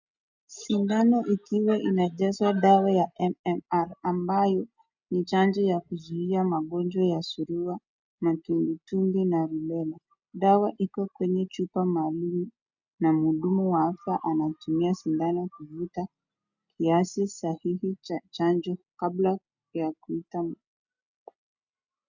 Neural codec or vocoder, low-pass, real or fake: none; 7.2 kHz; real